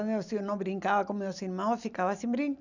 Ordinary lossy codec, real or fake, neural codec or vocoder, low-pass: none; real; none; 7.2 kHz